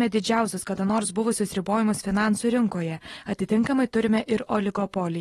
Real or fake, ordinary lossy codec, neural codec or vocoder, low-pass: real; AAC, 32 kbps; none; 19.8 kHz